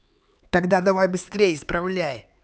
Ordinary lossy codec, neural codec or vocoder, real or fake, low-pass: none; codec, 16 kHz, 4 kbps, X-Codec, HuBERT features, trained on LibriSpeech; fake; none